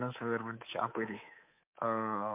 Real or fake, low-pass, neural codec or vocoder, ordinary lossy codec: fake; 3.6 kHz; codec, 24 kHz, 3.1 kbps, DualCodec; MP3, 32 kbps